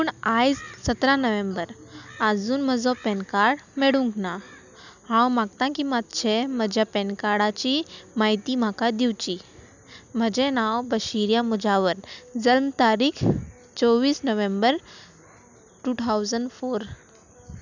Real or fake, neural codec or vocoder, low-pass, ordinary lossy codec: real; none; 7.2 kHz; none